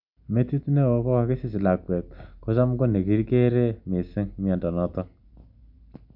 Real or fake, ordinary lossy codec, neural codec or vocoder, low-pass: real; none; none; 5.4 kHz